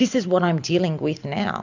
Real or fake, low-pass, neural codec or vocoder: real; 7.2 kHz; none